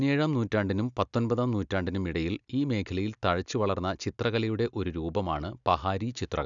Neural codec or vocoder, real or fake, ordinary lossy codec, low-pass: none; real; none; 7.2 kHz